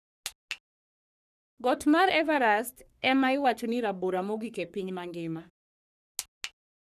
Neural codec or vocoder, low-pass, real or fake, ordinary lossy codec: codec, 44.1 kHz, 3.4 kbps, Pupu-Codec; 14.4 kHz; fake; none